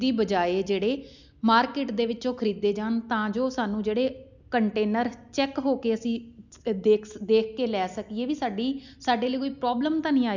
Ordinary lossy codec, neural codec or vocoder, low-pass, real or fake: none; none; 7.2 kHz; real